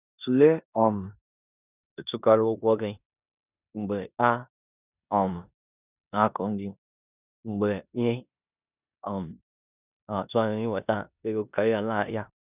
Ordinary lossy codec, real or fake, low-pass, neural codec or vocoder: none; fake; 3.6 kHz; codec, 16 kHz in and 24 kHz out, 0.9 kbps, LongCat-Audio-Codec, four codebook decoder